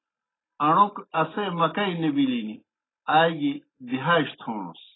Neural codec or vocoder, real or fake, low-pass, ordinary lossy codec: none; real; 7.2 kHz; AAC, 16 kbps